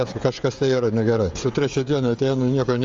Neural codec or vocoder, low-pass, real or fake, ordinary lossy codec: codec, 16 kHz, 16 kbps, FreqCodec, smaller model; 7.2 kHz; fake; Opus, 32 kbps